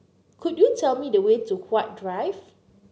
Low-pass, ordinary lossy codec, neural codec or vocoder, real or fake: none; none; none; real